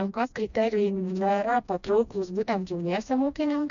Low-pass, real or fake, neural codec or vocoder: 7.2 kHz; fake; codec, 16 kHz, 1 kbps, FreqCodec, smaller model